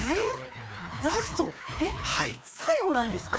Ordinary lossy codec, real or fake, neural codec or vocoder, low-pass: none; fake; codec, 16 kHz, 2 kbps, FreqCodec, larger model; none